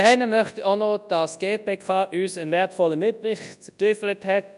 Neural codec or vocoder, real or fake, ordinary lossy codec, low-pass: codec, 24 kHz, 0.9 kbps, WavTokenizer, large speech release; fake; none; 10.8 kHz